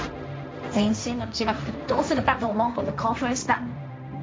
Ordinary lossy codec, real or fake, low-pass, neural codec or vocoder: none; fake; none; codec, 16 kHz, 1.1 kbps, Voila-Tokenizer